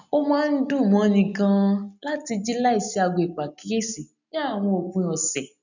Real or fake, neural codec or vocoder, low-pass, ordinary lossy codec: real; none; 7.2 kHz; none